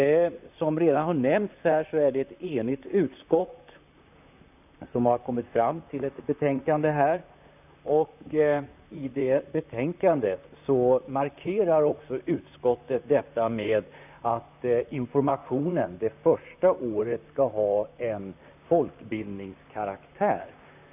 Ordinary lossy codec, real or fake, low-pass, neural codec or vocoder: none; fake; 3.6 kHz; vocoder, 44.1 kHz, 128 mel bands, Pupu-Vocoder